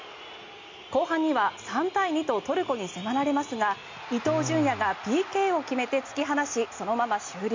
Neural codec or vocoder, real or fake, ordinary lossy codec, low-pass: none; real; MP3, 64 kbps; 7.2 kHz